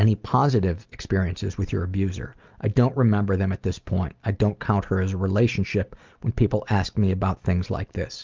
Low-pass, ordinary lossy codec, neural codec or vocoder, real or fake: 7.2 kHz; Opus, 24 kbps; none; real